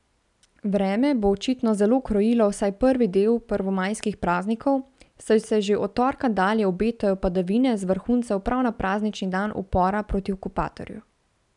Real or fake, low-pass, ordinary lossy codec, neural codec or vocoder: real; 10.8 kHz; none; none